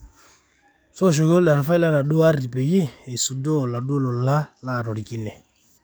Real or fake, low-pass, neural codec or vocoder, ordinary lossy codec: fake; none; codec, 44.1 kHz, 7.8 kbps, DAC; none